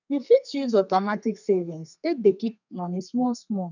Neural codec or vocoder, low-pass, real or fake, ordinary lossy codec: codec, 32 kHz, 1.9 kbps, SNAC; 7.2 kHz; fake; none